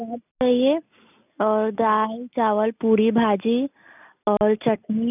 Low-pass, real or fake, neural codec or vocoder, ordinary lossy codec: 3.6 kHz; real; none; none